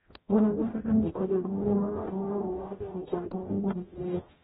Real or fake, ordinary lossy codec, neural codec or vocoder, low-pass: fake; AAC, 16 kbps; codec, 44.1 kHz, 0.9 kbps, DAC; 19.8 kHz